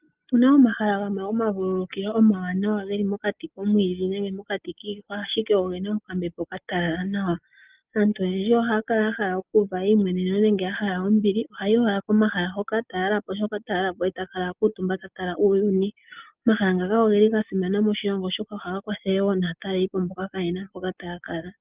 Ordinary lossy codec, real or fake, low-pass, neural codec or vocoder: Opus, 32 kbps; real; 3.6 kHz; none